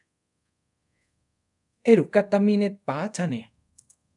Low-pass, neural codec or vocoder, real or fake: 10.8 kHz; codec, 24 kHz, 0.5 kbps, DualCodec; fake